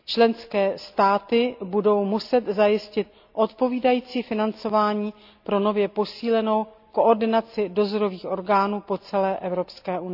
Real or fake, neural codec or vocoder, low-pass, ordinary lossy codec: real; none; 5.4 kHz; AAC, 48 kbps